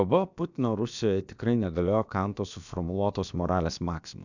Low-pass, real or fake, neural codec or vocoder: 7.2 kHz; fake; codec, 16 kHz, about 1 kbps, DyCAST, with the encoder's durations